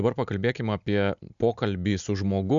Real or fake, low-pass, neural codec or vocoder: real; 7.2 kHz; none